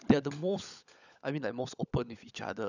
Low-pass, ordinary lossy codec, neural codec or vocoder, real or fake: 7.2 kHz; none; codec, 16 kHz, 16 kbps, FunCodec, trained on LibriTTS, 50 frames a second; fake